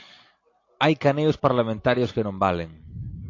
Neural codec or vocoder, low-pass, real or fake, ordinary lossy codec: none; 7.2 kHz; real; AAC, 48 kbps